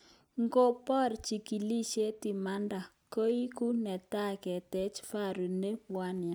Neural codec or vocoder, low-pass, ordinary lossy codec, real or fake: none; none; none; real